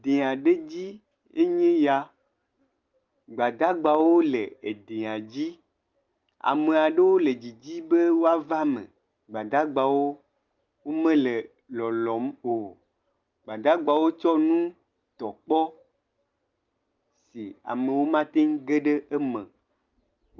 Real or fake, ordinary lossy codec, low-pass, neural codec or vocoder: real; Opus, 24 kbps; 7.2 kHz; none